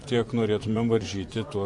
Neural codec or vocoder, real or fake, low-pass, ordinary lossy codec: vocoder, 24 kHz, 100 mel bands, Vocos; fake; 10.8 kHz; AAC, 64 kbps